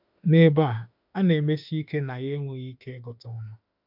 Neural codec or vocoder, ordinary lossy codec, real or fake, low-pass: autoencoder, 48 kHz, 32 numbers a frame, DAC-VAE, trained on Japanese speech; AAC, 48 kbps; fake; 5.4 kHz